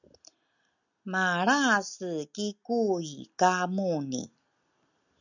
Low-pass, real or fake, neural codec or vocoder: 7.2 kHz; real; none